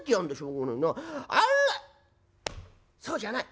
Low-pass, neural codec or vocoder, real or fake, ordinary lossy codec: none; none; real; none